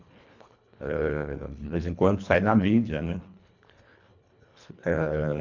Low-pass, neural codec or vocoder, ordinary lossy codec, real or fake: 7.2 kHz; codec, 24 kHz, 1.5 kbps, HILCodec; none; fake